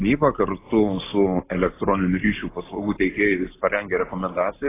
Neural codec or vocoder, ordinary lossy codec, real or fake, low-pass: none; AAC, 16 kbps; real; 3.6 kHz